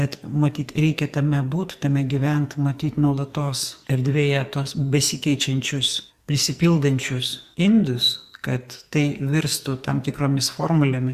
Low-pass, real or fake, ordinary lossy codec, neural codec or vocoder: 14.4 kHz; fake; Opus, 64 kbps; codec, 44.1 kHz, 2.6 kbps, SNAC